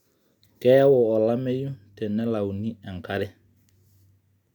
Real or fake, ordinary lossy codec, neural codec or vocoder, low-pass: real; none; none; 19.8 kHz